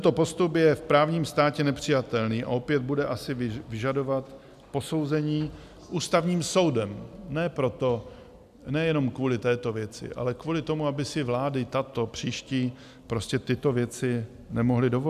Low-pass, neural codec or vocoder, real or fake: 14.4 kHz; none; real